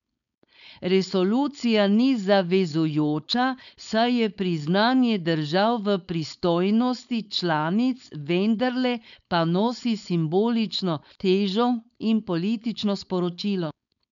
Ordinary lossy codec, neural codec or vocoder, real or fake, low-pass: none; codec, 16 kHz, 4.8 kbps, FACodec; fake; 7.2 kHz